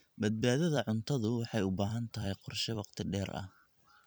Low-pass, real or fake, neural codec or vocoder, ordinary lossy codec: none; fake; vocoder, 44.1 kHz, 128 mel bands every 256 samples, BigVGAN v2; none